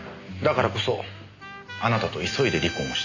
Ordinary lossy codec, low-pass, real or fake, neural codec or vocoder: AAC, 48 kbps; 7.2 kHz; real; none